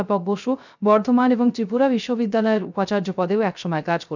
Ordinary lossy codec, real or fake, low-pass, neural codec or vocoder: none; fake; 7.2 kHz; codec, 16 kHz, 0.3 kbps, FocalCodec